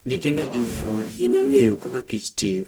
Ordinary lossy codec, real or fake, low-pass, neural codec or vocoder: none; fake; none; codec, 44.1 kHz, 0.9 kbps, DAC